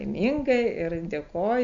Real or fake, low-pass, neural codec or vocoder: real; 7.2 kHz; none